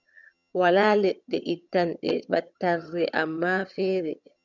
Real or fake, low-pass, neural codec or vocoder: fake; 7.2 kHz; vocoder, 22.05 kHz, 80 mel bands, HiFi-GAN